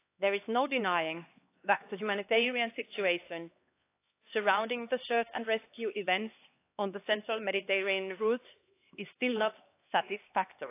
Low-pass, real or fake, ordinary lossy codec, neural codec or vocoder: 3.6 kHz; fake; AAC, 24 kbps; codec, 16 kHz, 2 kbps, X-Codec, HuBERT features, trained on LibriSpeech